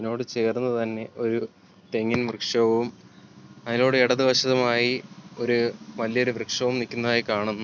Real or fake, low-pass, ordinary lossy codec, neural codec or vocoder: real; 7.2 kHz; none; none